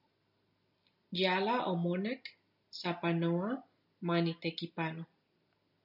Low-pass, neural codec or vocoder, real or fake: 5.4 kHz; none; real